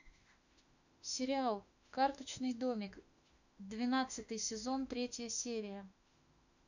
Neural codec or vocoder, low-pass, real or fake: autoencoder, 48 kHz, 32 numbers a frame, DAC-VAE, trained on Japanese speech; 7.2 kHz; fake